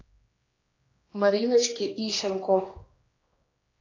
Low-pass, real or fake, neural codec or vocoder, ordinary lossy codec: 7.2 kHz; fake; codec, 16 kHz, 2 kbps, X-Codec, HuBERT features, trained on general audio; AAC, 32 kbps